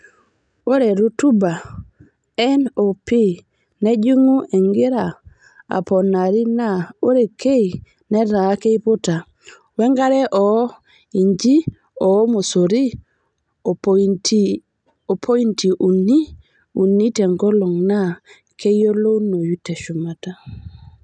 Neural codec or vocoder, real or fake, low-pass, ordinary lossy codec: none; real; 9.9 kHz; none